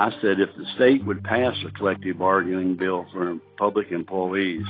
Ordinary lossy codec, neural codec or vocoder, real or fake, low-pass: AAC, 24 kbps; none; real; 5.4 kHz